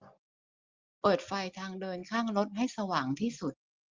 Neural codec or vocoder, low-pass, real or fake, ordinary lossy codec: none; 7.2 kHz; real; Opus, 32 kbps